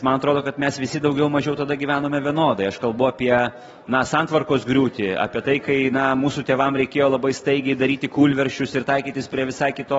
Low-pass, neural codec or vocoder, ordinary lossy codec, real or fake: 19.8 kHz; none; AAC, 24 kbps; real